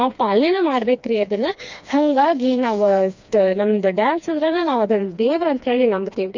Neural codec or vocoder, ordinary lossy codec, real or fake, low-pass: codec, 16 kHz, 2 kbps, FreqCodec, smaller model; MP3, 64 kbps; fake; 7.2 kHz